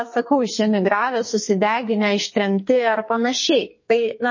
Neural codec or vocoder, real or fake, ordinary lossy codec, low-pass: codec, 44.1 kHz, 2.6 kbps, SNAC; fake; MP3, 32 kbps; 7.2 kHz